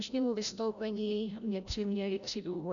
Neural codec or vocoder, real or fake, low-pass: codec, 16 kHz, 0.5 kbps, FreqCodec, larger model; fake; 7.2 kHz